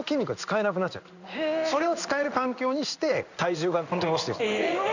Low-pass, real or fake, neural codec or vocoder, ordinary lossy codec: 7.2 kHz; fake; codec, 16 kHz in and 24 kHz out, 1 kbps, XY-Tokenizer; none